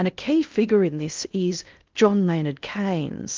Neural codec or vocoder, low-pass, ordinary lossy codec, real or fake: codec, 16 kHz in and 24 kHz out, 0.6 kbps, FocalCodec, streaming, 2048 codes; 7.2 kHz; Opus, 24 kbps; fake